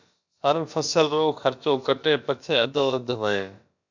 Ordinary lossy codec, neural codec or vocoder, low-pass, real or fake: MP3, 64 kbps; codec, 16 kHz, about 1 kbps, DyCAST, with the encoder's durations; 7.2 kHz; fake